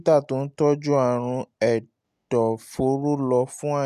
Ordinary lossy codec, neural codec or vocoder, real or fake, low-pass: none; none; real; 14.4 kHz